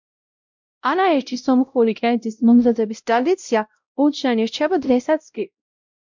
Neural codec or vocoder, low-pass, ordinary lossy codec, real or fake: codec, 16 kHz, 0.5 kbps, X-Codec, WavLM features, trained on Multilingual LibriSpeech; 7.2 kHz; MP3, 64 kbps; fake